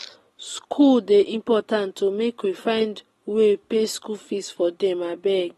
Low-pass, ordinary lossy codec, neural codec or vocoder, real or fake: 19.8 kHz; AAC, 32 kbps; none; real